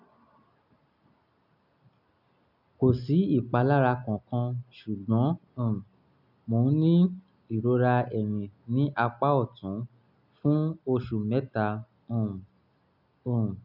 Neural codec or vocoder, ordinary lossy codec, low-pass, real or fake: none; none; 5.4 kHz; real